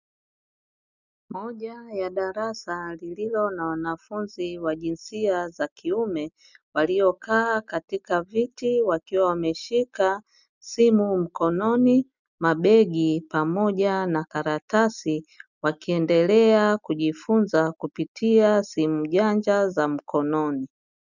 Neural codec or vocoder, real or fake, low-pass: none; real; 7.2 kHz